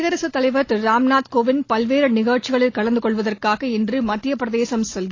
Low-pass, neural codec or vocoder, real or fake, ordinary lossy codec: 7.2 kHz; none; real; AAC, 32 kbps